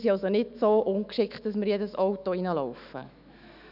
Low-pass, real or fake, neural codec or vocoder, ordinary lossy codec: 5.4 kHz; fake; autoencoder, 48 kHz, 128 numbers a frame, DAC-VAE, trained on Japanese speech; none